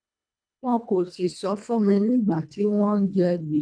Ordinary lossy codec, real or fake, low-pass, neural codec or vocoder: MP3, 64 kbps; fake; 9.9 kHz; codec, 24 kHz, 1.5 kbps, HILCodec